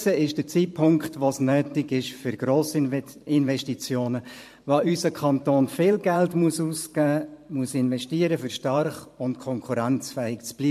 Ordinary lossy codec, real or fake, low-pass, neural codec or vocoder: MP3, 64 kbps; fake; 14.4 kHz; vocoder, 44.1 kHz, 128 mel bands every 512 samples, BigVGAN v2